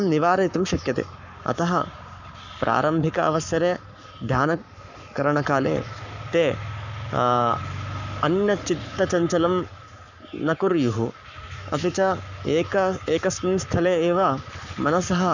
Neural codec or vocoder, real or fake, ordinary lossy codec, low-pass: codec, 44.1 kHz, 7.8 kbps, Pupu-Codec; fake; none; 7.2 kHz